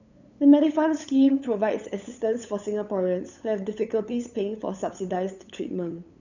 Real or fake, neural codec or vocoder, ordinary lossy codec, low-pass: fake; codec, 16 kHz, 8 kbps, FunCodec, trained on LibriTTS, 25 frames a second; none; 7.2 kHz